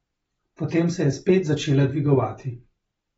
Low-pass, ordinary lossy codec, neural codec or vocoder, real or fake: 9.9 kHz; AAC, 24 kbps; none; real